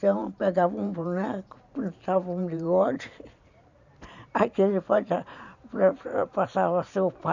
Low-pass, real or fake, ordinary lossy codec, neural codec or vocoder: 7.2 kHz; fake; none; vocoder, 44.1 kHz, 128 mel bands every 512 samples, BigVGAN v2